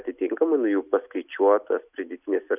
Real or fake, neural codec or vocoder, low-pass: real; none; 3.6 kHz